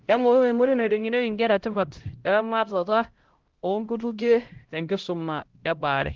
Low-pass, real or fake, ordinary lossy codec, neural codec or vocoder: 7.2 kHz; fake; Opus, 16 kbps; codec, 16 kHz, 0.5 kbps, X-Codec, HuBERT features, trained on LibriSpeech